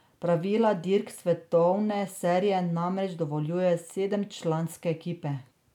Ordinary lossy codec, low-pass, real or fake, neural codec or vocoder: none; 19.8 kHz; real; none